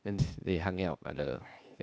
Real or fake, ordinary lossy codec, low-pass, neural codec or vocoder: fake; none; none; codec, 16 kHz, 0.8 kbps, ZipCodec